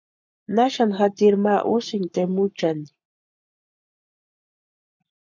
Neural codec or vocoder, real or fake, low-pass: codec, 44.1 kHz, 7.8 kbps, Pupu-Codec; fake; 7.2 kHz